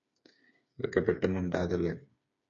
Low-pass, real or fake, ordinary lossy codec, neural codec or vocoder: 7.2 kHz; fake; MP3, 48 kbps; codec, 16 kHz, 4 kbps, FreqCodec, smaller model